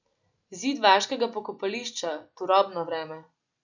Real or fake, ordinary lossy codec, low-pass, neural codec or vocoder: real; none; 7.2 kHz; none